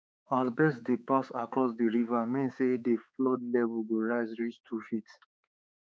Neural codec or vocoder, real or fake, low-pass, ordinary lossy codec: codec, 16 kHz, 4 kbps, X-Codec, HuBERT features, trained on balanced general audio; fake; none; none